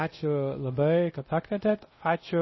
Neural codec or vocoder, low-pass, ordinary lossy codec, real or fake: codec, 24 kHz, 0.5 kbps, DualCodec; 7.2 kHz; MP3, 24 kbps; fake